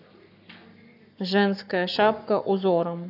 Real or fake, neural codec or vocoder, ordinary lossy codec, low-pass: fake; codec, 44.1 kHz, 7.8 kbps, Pupu-Codec; AAC, 48 kbps; 5.4 kHz